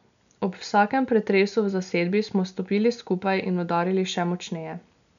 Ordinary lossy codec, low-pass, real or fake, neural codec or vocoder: none; 7.2 kHz; real; none